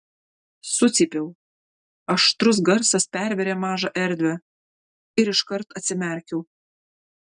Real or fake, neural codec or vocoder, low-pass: real; none; 9.9 kHz